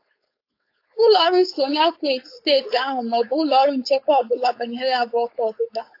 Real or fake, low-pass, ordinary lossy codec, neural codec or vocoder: fake; 5.4 kHz; AAC, 32 kbps; codec, 16 kHz, 4.8 kbps, FACodec